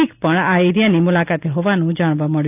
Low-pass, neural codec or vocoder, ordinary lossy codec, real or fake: 3.6 kHz; none; none; real